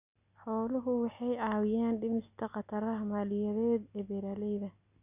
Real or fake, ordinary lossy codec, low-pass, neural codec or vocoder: real; none; 3.6 kHz; none